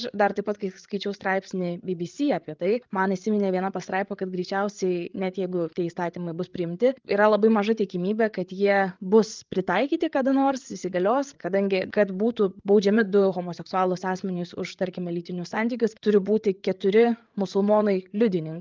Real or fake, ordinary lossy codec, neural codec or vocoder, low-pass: fake; Opus, 32 kbps; codec, 16 kHz, 8 kbps, FreqCodec, larger model; 7.2 kHz